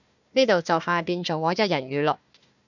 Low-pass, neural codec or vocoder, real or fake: 7.2 kHz; codec, 16 kHz, 1 kbps, FunCodec, trained on Chinese and English, 50 frames a second; fake